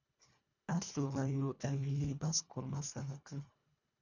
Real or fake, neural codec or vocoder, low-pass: fake; codec, 24 kHz, 1.5 kbps, HILCodec; 7.2 kHz